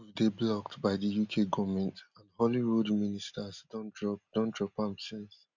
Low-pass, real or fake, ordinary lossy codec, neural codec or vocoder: 7.2 kHz; real; none; none